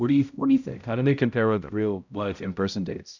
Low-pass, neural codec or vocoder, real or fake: 7.2 kHz; codec, 16 kHz, 0.5 kbps, X-Codec, HuBERT features, trained on balanced general audio; fake